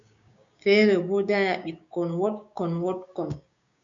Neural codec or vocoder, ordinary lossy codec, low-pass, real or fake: codec, 16 kHz, 6 kbps, DAC; MP3, 64 kbps; 7.2 kHz; fake